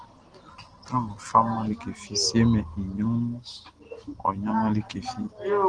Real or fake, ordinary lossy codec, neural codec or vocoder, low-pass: real; Opus, 16 kbps; none; 9.9 kHz